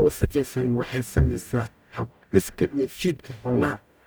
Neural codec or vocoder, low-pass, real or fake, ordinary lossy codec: codec, 44.1 kHz, 0.9 kbps, DAC; none; fake; none